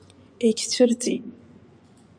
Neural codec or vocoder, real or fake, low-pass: vocoder, 24 kHz, 100 mel bands, Vocos; fake; 9.9 kHz